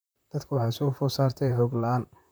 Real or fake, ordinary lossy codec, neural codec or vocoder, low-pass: fake; none; vocoder, 44.1 kHz, 128 mel bands, Pupu-Vocoder; none